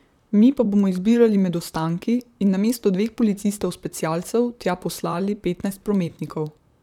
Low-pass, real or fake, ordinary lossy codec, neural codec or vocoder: 19.8 kHz; fake; none; vocoder, 44.1 kHz, 128 mel bands, Pupu-Vocoder